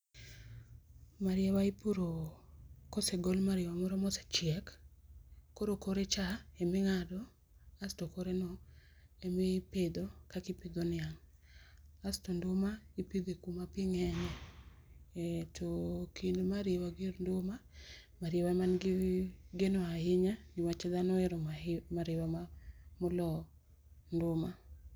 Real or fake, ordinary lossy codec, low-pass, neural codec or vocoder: real; none; none; none